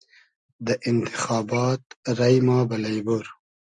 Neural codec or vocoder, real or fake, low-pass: none; real; 9.9 kHz